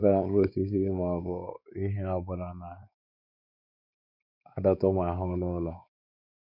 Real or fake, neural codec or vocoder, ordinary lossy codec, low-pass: fake; codec, 16 kHz, 4 kbps, X-Codec, WavLM features, trained on Multilingual LibriSpeech; none; 5.4 kHz